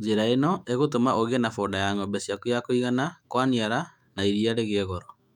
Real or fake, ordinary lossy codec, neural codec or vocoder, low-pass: fake; none; autoencoder, 48 kHz, 128 numbers a frame, DAC-VAE, trained on Japanese speech; 19.8 kHz